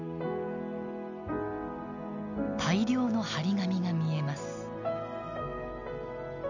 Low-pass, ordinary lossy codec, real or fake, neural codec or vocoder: 7.2 kHz; none; real; none